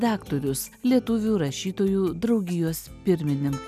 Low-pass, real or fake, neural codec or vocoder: 14.4 kHz; real; none